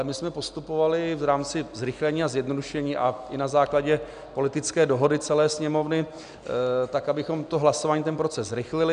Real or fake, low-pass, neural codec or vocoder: real; 9.9 kHz; none